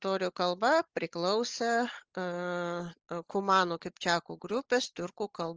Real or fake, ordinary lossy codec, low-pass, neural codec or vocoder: real; Opus, 24 kbps; 7.2 kHz; none